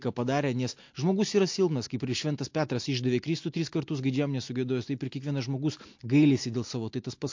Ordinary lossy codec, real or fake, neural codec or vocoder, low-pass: MP3, 48 kbps; real; none; 7.2 kHz